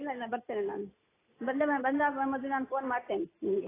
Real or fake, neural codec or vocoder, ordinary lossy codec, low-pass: real; none; AAC, 16 kbps; 3.6 kHz